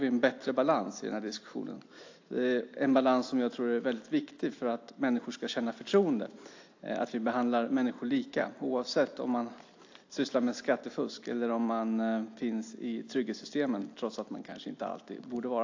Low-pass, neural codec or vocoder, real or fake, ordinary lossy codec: 7.2 kHz; none; real; AAC, 48 kbps